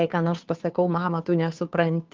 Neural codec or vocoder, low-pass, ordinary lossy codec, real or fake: codec, 16 kHz, 2 kbps, FunCodec, trained on Chinese and English, 25 frames a second; 7.2 kHz; Opus, 32 kbps; fake